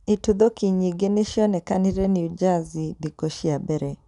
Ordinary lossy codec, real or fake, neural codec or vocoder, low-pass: none; real; none; 10.8 kHz